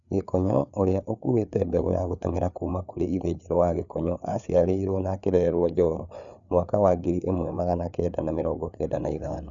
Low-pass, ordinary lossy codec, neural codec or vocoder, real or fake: 7.2 kHz; none; codec, 16 kHz, 4 kbps, FreqCodec, larger model; fake